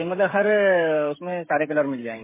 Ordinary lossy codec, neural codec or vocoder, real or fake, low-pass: MP3, 16 kbps; codec, 16 kHz, 16 kbps, FreqCodec, smaller model; fake; 3.6 kHz